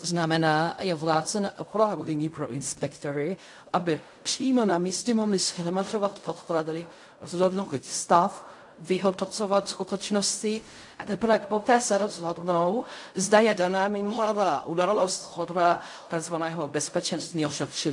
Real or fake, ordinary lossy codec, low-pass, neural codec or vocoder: fake; AAC, 64 kbps; 10.8 kHz; codec, 16 kHz in and 24 kHz out, 0.4 kbps, LongCat-Audio-Codec, fine tuned four codebook decoder